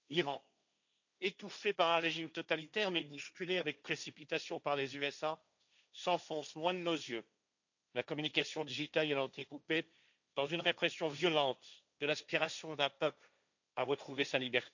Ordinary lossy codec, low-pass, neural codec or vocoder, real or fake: none; 7.2 kHz; codec, 16 kHz, 1.1 kbps, Voila-Tokenizer; fake